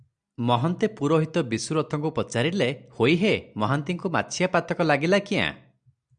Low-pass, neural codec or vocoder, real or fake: 9.9 kHz; none; real